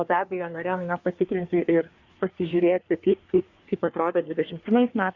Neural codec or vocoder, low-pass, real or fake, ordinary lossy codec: codec, 24 kHz, 1 kbps, SNAC; 7.2 kHz; fake; Opus, 64 kbps